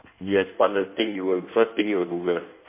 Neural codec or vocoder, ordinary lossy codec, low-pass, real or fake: codec, 16 kHz in and 24 kHz out, 1.1 kbps, FireRedTTS-2 codec; MP3, 24 kbps; 3.6 kHz; fake